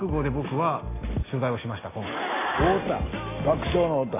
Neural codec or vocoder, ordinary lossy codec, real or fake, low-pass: none; AAC, 16 kbps; real; 3.6 kHz